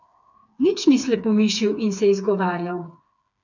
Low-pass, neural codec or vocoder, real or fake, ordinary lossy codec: 7.2 kHz; codec, 16 kHz, 4 kbps, FreqCodec, smaller model; fake; none